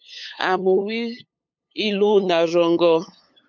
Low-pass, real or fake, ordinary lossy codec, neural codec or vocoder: 7.2 kHz; fake; MP3, 64 kbps; codec, 16 kHz, 8 kbps, FunCodec, trained on LibriTTS, 25 frames a second